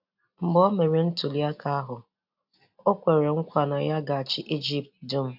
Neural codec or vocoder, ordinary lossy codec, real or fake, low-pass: none; AAC, 48 kbps; real; 5.4 kHz